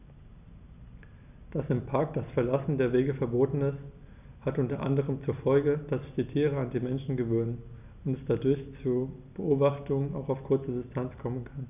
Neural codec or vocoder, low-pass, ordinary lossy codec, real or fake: none; 3.6 kHz; none; real